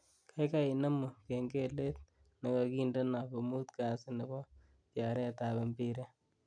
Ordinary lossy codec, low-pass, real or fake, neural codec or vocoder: none; 9.9 kHz; real; none